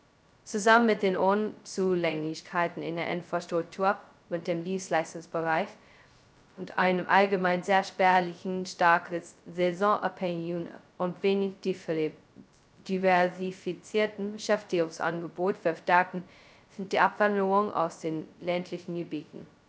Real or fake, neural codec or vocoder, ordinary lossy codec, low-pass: fake; codec, 16 kHz, 0.2 kbps, FocalCodec; none; none